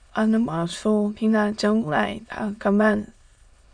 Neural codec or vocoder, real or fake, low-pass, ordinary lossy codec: autoencoder, 22.05 kHz, a latent of 192 numbers a frame, VITS, trained on many speakers; fake; 9.9 kHz; MP3, 96 kbps